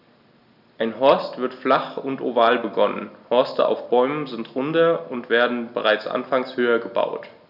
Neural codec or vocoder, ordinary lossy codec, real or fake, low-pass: none; none; real; 5.4 kHz